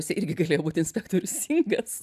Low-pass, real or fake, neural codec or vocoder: 14.4 kHz; real; none